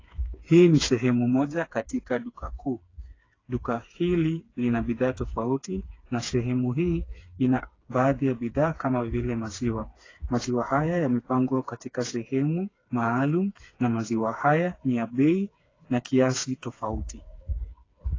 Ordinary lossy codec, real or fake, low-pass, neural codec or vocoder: AAC, 32 kbps; fake; 7.2 kHz; codec, 16 kHz, 4 kbps, FreqCodec, smaller model